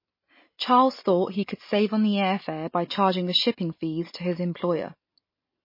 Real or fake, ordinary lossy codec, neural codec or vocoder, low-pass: real; MP3, 24 kbps; none; 5.4 kHz